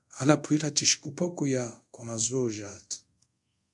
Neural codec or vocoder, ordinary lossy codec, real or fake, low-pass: codec, 24 kHz, 0.5 kbps, DualCodec; MP3, 64 kbps; fake; 10.8 kHz